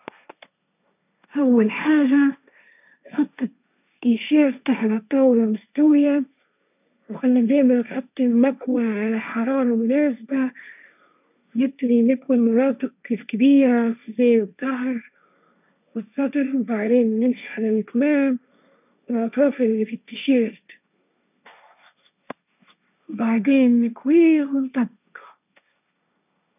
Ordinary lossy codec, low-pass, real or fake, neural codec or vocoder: none; 3.6 kHz; fake; codec, 16 kHz, 1.1 kbps, Voila-Tokenizer